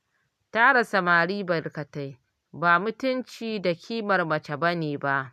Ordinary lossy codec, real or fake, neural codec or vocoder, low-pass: none; real; none; 14.4 kHz